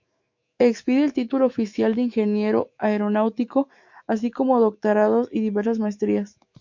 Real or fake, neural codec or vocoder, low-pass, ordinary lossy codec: fake; autoencoder, 48 kHz, 128 numbers a frame, DAC-VAE, trained on Japanese speech; 7.2 kHz; MP3, 48 kbps